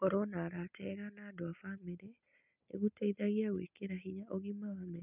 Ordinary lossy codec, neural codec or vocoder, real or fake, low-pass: none; none; real; 3.6 kHz